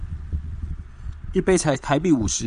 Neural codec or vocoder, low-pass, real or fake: vocoder, 22.05 kHz, 80 mel bands, Vocos; 9.9 kHz; fake